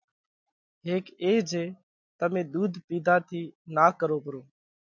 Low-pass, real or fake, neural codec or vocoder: 7.2 kHz; real; none